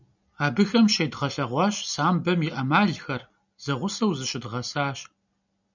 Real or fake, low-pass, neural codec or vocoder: real; 7.2 kHz; none